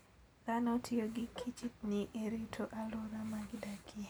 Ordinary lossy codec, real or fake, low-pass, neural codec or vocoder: none; real; none; none